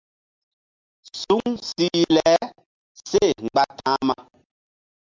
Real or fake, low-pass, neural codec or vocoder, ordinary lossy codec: real; 7.2 kHz; none; MP3, 64 kbps